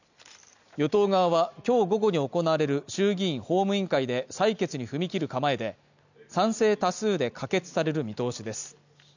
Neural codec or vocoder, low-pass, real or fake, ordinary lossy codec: none; 7.2 kHz; real; none